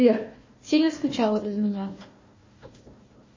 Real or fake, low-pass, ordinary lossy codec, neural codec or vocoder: fake; 7.2 kHz; MP3, 32 kbps; codec, 16 kHz, 1 kbps, FunCodec, trained on Chinese and English, 50 frames a second